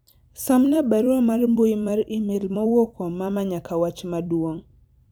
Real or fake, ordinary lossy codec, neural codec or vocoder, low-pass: fake; none; vocoder, 44.1 kHz, 128 mel bands every 256 samples, BigVGAN v2; none